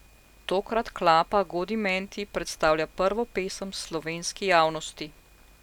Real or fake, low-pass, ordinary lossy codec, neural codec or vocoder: real; 19.8 kHz; none; none